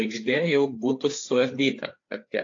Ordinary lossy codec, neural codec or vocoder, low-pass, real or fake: AAC, 48 kbps; codec, 16 kHz, 4 kbps, FreqCodec, larger model; 7.2 kHz; fake